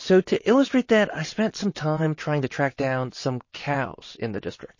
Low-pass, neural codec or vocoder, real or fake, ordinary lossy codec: 7.2 kHz; vocoder, 22.05 kHz, 80 mel bands, WaveNeXt; fake; MP3, 32 kbps